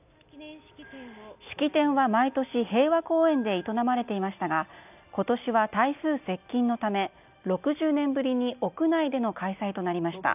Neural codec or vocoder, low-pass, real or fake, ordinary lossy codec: none; 3.6 kHz; real; none